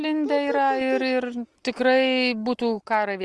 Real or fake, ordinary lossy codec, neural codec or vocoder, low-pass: real; Opus, 24 kbps; none; 10.8 kHz